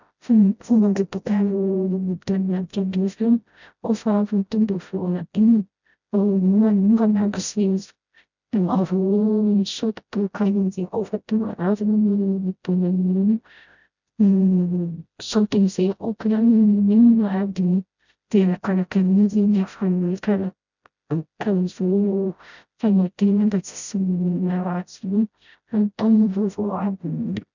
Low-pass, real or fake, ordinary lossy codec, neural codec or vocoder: 7.2 kHz; fake; none; codec, 16 kHz, 0.5 kbps, FreqCodec, smaller model